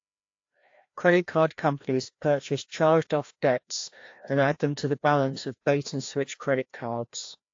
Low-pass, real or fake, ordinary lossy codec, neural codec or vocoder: 7.2 kHz; fake; AAC, 48 kbps; codec, 16 kHz, 1 kbps, FreqCodec, larger model